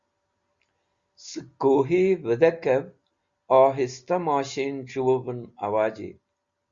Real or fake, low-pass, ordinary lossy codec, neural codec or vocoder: real; 7.2 kHz; Opus, 64 kbps; none